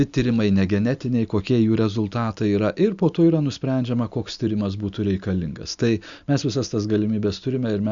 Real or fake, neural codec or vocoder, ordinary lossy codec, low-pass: real; none; Opus, 64 kbps; 7.2 kHz